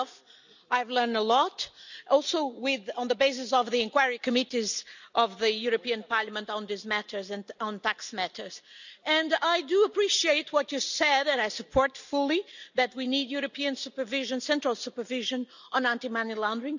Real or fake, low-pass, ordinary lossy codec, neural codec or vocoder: real; 7.2 kHz; none; none